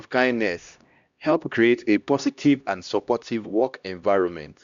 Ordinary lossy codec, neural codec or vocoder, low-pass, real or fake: Opus, 64 kbps; codec, 16 kHz, 1 kbps, X-Codec, HuBERT features, trained on LibriSpeech; 7.2 kHz; fake